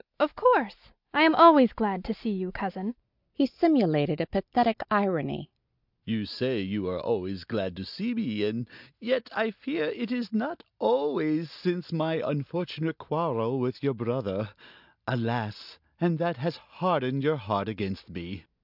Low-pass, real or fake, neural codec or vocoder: 5.4 kHz; real; none